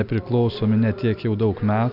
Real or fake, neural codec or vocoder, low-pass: real; none; 5.4 kHz